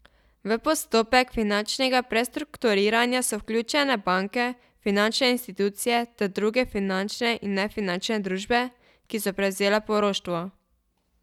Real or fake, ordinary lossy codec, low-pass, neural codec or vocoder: real; none; 19.8 kHz; none